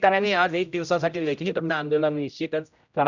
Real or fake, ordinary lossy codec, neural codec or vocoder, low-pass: fake; none; codec, 16 kHz, 0.5 kbps, X-Codec, HuBERT features, trained on general audio; 7.2 kHz